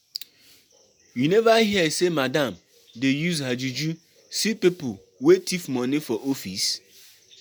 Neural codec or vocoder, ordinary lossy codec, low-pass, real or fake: none; none; none; real